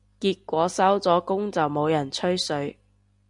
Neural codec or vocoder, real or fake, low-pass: none; real; 10.8 kHz